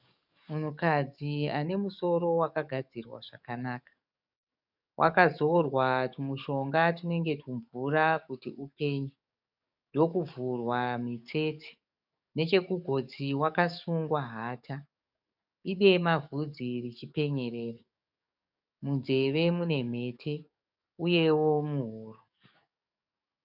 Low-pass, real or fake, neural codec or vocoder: 5.4 kHz; fake; codec, 44.1 kHz, 7.8 kbps, DAC